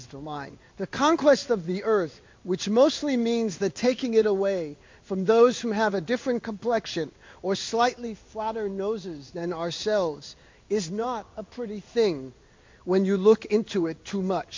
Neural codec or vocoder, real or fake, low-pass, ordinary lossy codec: codec, 16 kHz in and 24 kHz out, 1 kbps, XY-Tokenizer; fake; 7.2 kHz; MP3, 48 kbps